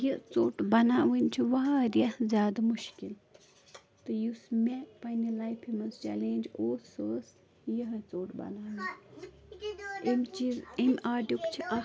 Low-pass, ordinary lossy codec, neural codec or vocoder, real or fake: none; none; none; real